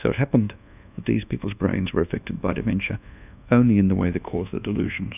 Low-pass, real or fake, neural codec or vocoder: 3.6 kHz; fake; codec, 24 kHz, 1.2 kbps, DualCodec